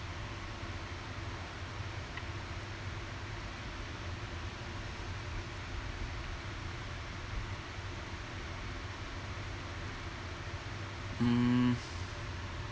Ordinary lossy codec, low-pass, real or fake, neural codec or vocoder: none; none; real; none